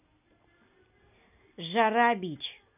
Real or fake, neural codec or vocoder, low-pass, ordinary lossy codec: real; none; 3.6 kHz; none